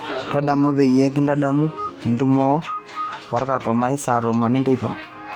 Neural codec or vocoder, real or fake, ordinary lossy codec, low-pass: codec, 44.1 kHz, 2.6 kbps, DAC; fake; none; 19.8 kHz